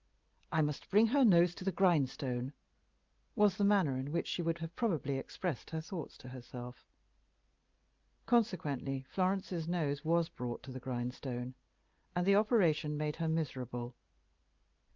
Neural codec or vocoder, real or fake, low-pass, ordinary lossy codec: none; real; 7.2 kHz; Opus, 24 kbps